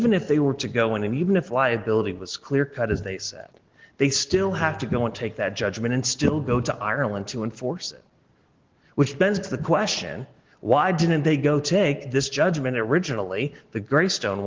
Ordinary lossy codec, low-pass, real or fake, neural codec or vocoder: Opus, 16 kbps; 7.2 kHz; real; none